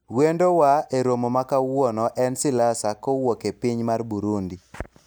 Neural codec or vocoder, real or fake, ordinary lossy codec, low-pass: none; real; none; none